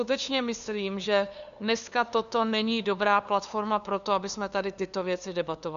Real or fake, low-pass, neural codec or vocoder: fake; 7.2 kHz; codec, 16 kHz, 2 kbps, FunCodec, trained on LibriTTS, 25 frames a second